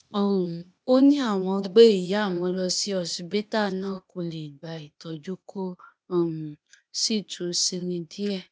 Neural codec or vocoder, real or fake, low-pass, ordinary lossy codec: codec, 16 kHz, 0.8 kbps, ZipCodec; fake; none; none